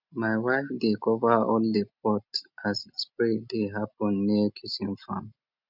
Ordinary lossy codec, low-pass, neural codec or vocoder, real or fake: none; 5.4 kHz; none; real